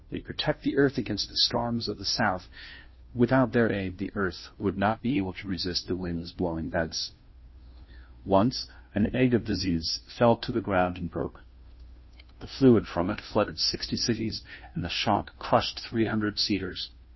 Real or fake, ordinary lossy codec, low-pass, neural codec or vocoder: fake; MP3, 24 kbps; 7.2 kHz; codec, 16 kHz, 0.5 kbps, FunCodec, trained on Chinese and English, 25 frames a second